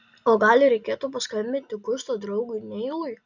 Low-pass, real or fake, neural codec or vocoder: 7.2 kHz; real; none